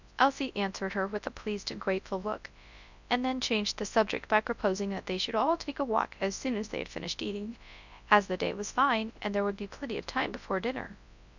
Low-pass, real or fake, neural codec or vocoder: 7.2 kHz; fake; codec, 24 kHz, 0.9 kbps, WavTokenizer, large speech release